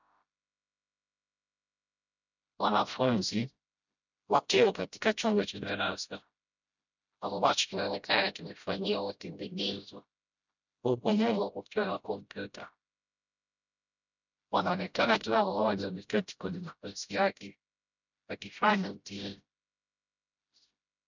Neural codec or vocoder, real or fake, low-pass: codec, 16 kHz, 0.5 kbps, FreqCodec, smaller model; fake; 7.2 kHz